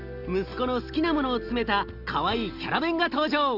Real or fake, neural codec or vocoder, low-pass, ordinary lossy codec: real; none; 5.4 kHz; Opus, 32 kbps